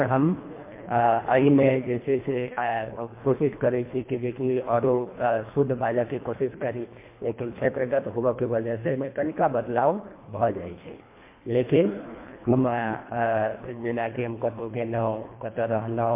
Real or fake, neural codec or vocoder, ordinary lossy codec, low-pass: fake; codec, 24 kHz, 1.5 kbps, HILCodec; MP3, 24 kbps; 3.6 kHz